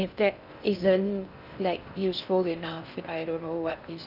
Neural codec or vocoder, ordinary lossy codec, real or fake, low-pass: codec, 16 kHz in and 24 kHz out, 0.6 kbps, FocalCodec, streaming, 2048 codes; none; fake; 5.4 kHz